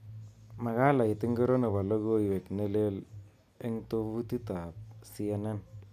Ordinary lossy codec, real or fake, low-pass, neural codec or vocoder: none; real; 14.4 kHz; none